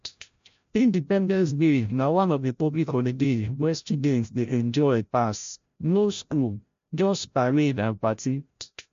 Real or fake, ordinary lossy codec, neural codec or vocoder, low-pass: fake; MP3, 48 kbps; codec, 16 kHz, 0.5 kbps, FreqCodec, larger model; 7.2 kHz